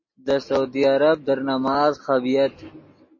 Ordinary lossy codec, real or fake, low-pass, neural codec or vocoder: MP3, 32 kbps; real; 7.2 kHz; none